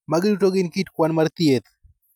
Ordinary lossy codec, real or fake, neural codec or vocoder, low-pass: none; real; none; 19.8 kHz